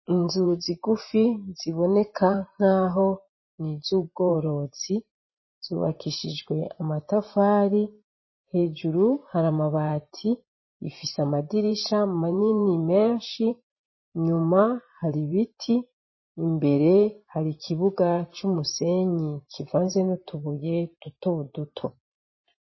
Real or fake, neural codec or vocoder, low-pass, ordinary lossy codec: fake; vocoder, 44.1 kHz, 128 mel bands every 512 samples, BigVGAN v2; 7.2 kHz; MP3, 24 kbps